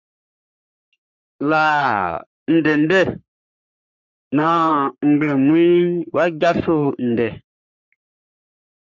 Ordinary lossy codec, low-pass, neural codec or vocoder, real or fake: MP3, 64 kbps; 7.2 kHz; codec, 44.1 kHz, 3.4 kbps, Pupu-Codec; fake